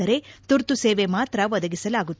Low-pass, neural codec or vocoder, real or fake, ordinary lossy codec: none; none; real; none